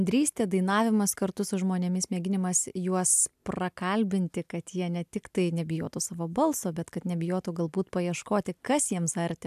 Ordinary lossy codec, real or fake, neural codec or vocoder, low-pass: AAC, 96 kbps; real; none; 14.4 kHz